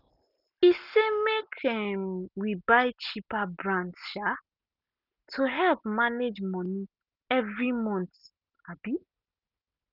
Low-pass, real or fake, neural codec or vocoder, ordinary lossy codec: 5.4 kHz; real; none; none